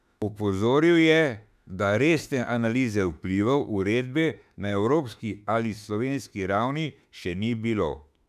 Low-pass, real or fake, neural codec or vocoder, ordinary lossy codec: 14.4 kHz; fake; autoencoder, 48 kHz, 32 numbers a frame, DAC-VAE, trained on Japanese speech; none